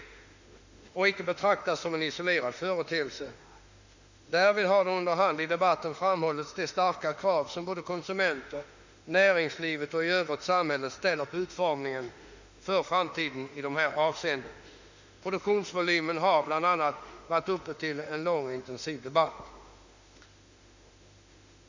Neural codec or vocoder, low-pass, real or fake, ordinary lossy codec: autoencoder, 48 kHz, 32 numbers a frame, DAC-VAE, trained on Japanese speech; 7.2 kHz; fake; Opus, 64 kbps